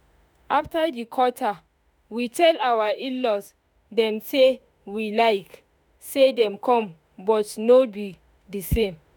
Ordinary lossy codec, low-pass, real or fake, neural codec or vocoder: none; none; fake; autoencoder, 48 kHz, 32 numbers a frame, DAC-VAE, trained on Japanese speech